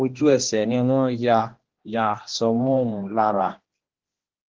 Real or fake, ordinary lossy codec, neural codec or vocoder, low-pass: fake; Opus, 16 kbps; codec, 16 kHz, 1 kbps, X-Codec, HuBERT features, trained on general audio; 7.2 kHz